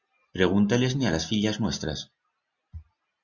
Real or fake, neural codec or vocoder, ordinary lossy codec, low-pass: real; none; Opus, 64 kbps; 7.2 kHz